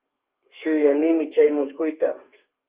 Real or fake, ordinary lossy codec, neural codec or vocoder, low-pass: fake; Opus, 24 kbps; codec, 32 kHz, 1.9 kbps, SNAC; 3.6 kHz